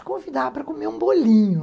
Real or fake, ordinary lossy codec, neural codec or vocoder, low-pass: real; none; none; none